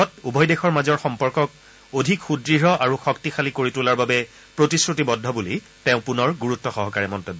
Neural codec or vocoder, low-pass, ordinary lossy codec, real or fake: none; none; none; real